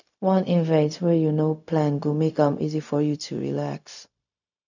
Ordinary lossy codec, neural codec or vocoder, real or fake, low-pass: none; codec, 16 kHz, 0.4 kbps, LongCat-Audio-Codec; fake; 7.2 kHz